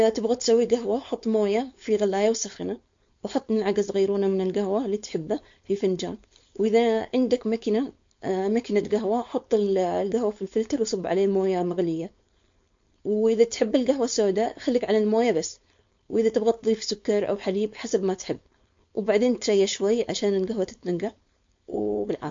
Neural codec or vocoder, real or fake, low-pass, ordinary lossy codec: codec, 16 kHz, 4.8 kbps, FACodec; fake; 7.2 kHz; MP3, 48 kbps